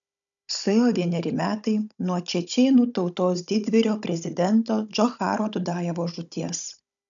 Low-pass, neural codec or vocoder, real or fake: 7.2 kHz; codec, 16 kHz, 16 kbps, FunCodec, trained on Chinese and English, 50 frames a second; fake